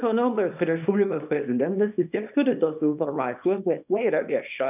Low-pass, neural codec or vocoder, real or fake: 3.6 kHz; codec, 24 kHz, 0.9 kbps, WavTokenizer, small release; fake